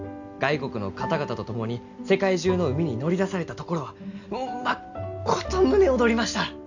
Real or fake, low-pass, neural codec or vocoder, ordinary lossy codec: real; 7.2 kHz; none; none